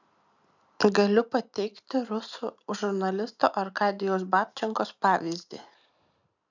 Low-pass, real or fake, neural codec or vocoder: 7.2 kHz; real; none